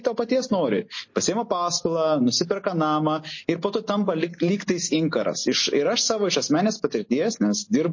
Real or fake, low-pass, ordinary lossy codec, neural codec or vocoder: real; 7.2 kHz; MP3, 32 kbps; none